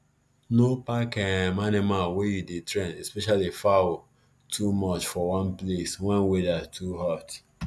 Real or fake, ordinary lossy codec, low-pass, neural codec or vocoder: real; none; none; none